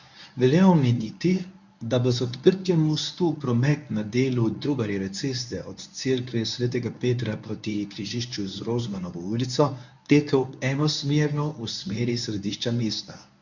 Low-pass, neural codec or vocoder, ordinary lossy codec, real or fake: 7.2 kHz; codec, 24 kHz, 0.9 kbps, WavTokenizer, medium speech release version 1; none; fake